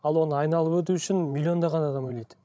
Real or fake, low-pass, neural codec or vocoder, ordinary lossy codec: fake; none; codec, 16 kHz, 16 kbps, FreqCodec, larger model; none